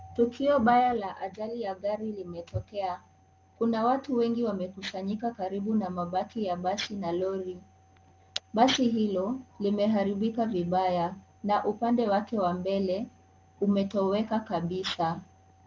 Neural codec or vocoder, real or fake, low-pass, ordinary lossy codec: none; real; 7.2 kHz; Opus, 32 kbps